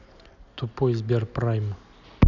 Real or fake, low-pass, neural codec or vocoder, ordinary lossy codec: real; 7.2 kHz; none; none